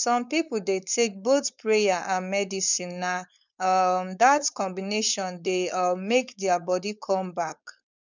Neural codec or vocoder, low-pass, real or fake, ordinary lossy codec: codec, 16 kHz, 4.8 kbps, FACodec; 7.2 kHz; fake; none